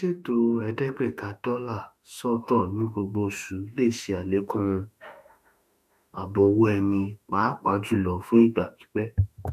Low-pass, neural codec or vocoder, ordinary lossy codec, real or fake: 14.4 kHz; autoencoder, 48 kHz, 32 numbers a frame, DAC-VAE, trained on Japanese speech; none; fake